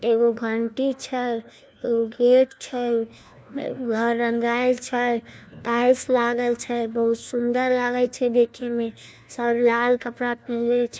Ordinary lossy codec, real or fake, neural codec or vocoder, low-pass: none; fake; codec, 16 kHz, 1 kbps, FunCodec, trained on LibriTTS, 50 frames a second; none